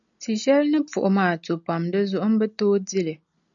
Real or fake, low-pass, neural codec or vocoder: real; 7.2 kHz; none